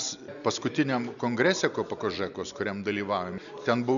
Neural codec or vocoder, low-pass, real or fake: none; 7.2 kHz; real